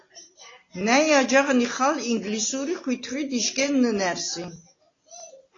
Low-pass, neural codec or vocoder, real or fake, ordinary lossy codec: 7.2 kHz; none; real; AAC, 32 kbps